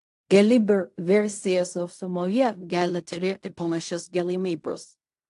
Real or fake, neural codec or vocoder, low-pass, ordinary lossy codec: fake; codec, 16 kHz in and 24 kHz out, 0.4 kbps, LongCat-Audio-Codec, fine tuned four codebook decoder; 10.8 kHz; AAC, 64 kbps